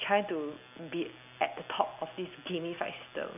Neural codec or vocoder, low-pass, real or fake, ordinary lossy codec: none; 3.6 kHz; real; none